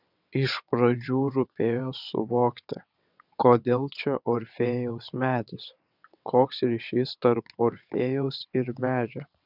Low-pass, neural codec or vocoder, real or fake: 5.4 kHz; vocoder, 22.05 kHz, 80 mel bands, WaveNeXt; fake